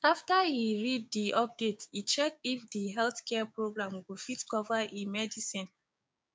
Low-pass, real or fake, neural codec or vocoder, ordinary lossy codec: none; fake; codec, 16 kHz, 6 kbps, DAC; none